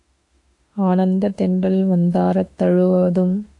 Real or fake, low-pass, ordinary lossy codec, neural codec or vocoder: fake; 10.8 kHz; AAC, 64 kbps; autoencoder, 48 kHz, 32 numbers a frame, DAC-VAE, trained on Japanese speech